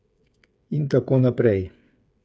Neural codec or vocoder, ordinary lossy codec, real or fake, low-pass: codec, 16 kHz, 8 kbps, FreqCodec, smaller model; none; fake; none